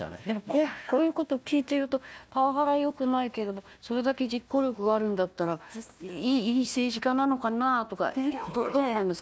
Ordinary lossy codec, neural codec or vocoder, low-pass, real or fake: none; codec, 16 kHz, 1 kbps, FunCodec, trained on Chinese and English, 50 frames a second; none; fake